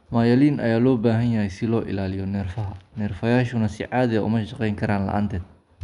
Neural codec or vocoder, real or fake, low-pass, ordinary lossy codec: none; real; 10.8 kHz; none